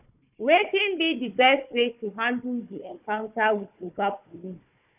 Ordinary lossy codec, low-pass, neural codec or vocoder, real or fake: none; 3.6 kHz; codec, 16 kHz, 4 kbps, FunCodec, trained on Chinese and English, 50 frames a second; fake